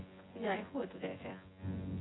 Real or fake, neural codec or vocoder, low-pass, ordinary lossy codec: fake; vocoder, 24 kHz, 100 mel bands, Vocos; 7.2 kHz; AAC, 16 kbps